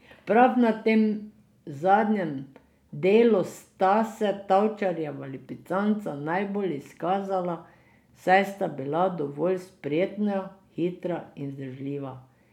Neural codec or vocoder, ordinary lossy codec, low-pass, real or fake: none; none; 19.8 kHz; real